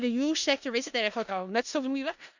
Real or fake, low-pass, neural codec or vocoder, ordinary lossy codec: fake; 7.2 kHz; codec, 16 kHz in and 24 kHz out, 0.4 kbps, LongCat-Audio-Codec, four codebook decoder; none